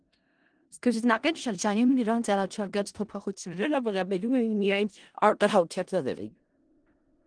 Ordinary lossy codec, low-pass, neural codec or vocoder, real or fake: Opus, 24 kbps; 9.9 kHz; codec, 16 kHz in and 24 kHz out, 0.4 kbps, LongCat-Audio-Codec, four codebook decoder; fake